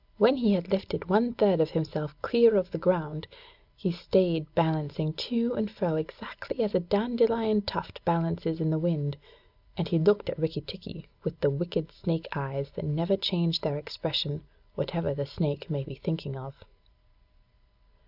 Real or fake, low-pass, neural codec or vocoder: real; 5.4 kHz; none